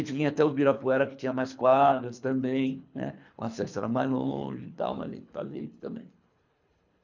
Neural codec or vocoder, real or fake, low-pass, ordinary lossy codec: codec, 24 kHz, 3 kbps, HILCodec; fake; 7.2 kHz; none